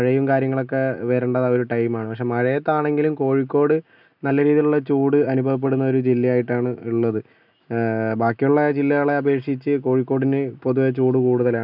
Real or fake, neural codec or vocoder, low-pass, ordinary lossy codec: real; none; 5.4 kHz; none